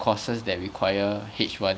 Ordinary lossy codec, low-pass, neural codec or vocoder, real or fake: none; none; none; real